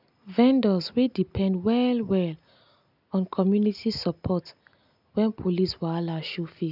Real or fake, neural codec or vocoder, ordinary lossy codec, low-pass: real; none; none; 5.4 kHz